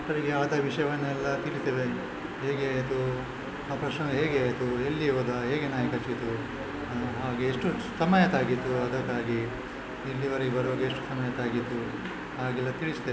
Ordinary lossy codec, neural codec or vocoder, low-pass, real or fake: none; none; none; real